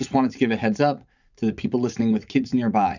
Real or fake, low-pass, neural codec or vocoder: fake; 7.2 kHz; vocoder, 44.1 kHz, 80 mel bands, Vocos